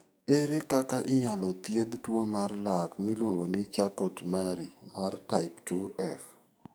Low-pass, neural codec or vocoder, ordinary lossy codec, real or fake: none; codec, 44.1 kHz, 2.6 kbps, SNAC; none; fake